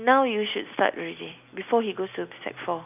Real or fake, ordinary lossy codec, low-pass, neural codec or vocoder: real; none; 3.6 kHz; none